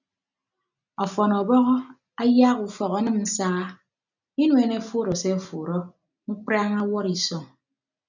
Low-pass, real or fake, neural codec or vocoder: 7.2 kHz; real; none